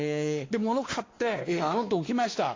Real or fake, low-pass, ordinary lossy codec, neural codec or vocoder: fake; 7.2 kHz; AAC, 32 kbps; codec, 16 kHz, 2 kbps, X-Codec, WavLM features, trained on Multilingual LibriSpeech